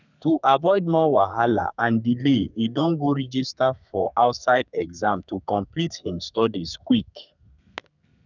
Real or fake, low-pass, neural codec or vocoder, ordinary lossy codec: fake; 7.2 kHz; codec, 32 kHz, 1.9 kbps, SNAC; none